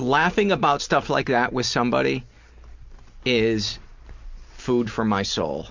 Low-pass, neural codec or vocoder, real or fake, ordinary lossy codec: 7.2 kHz; none; real; MP3, 64 kbps